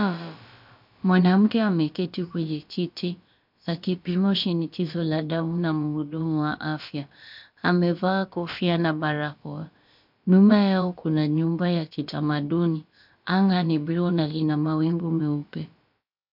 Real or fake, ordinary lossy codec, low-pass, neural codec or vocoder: fake; MP3, 48 kbps; 5.4 kHz; codec, 16 kHz, about 1 kbps, DyCAST, with the encoder's durations